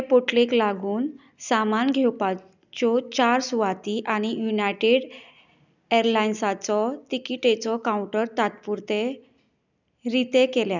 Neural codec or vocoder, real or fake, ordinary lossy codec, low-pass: none; real; none; 7.2 kHz